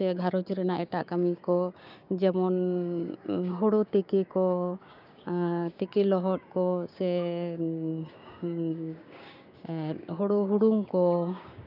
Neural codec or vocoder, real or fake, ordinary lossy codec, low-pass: codec, 16 kHz, 6 kbps, DAC; fake; none; 5.4 kHz